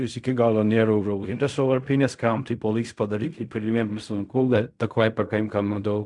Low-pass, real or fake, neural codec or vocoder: 10.8 kHz; fake; codec, 16 kHz in and 24 kHz out, 0.4 kbps, LongCat-Audio-Codec, fine tuned four codebook decoder